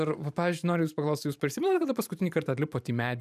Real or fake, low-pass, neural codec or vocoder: fake; 14.4 kHz; vocoder, 44.1 kHz, 128 mel bands every 512 samples, BigVGAN v2